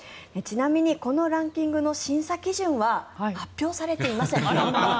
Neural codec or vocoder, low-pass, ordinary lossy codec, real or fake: none; none; none; real